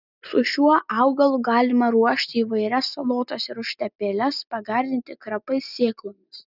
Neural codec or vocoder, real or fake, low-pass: none; real; 5.4 kHz